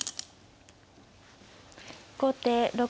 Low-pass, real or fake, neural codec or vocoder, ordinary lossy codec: none; real; none; none